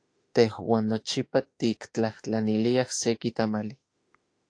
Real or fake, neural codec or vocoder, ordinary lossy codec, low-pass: fake; autoencoder, 48 kHz, 32 numbers a frame, DAC-VAE, trained on Japanese speech; AAC, 48 kbps; 9.9 kHz